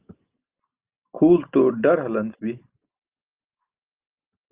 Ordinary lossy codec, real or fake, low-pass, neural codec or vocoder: Opus, 32 kbps; real; 3.6 kHz; none